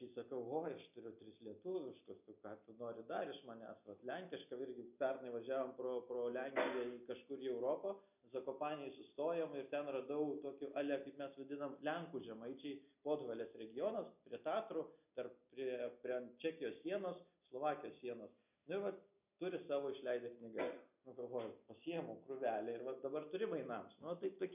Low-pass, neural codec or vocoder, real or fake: 3.6 kHz; none; real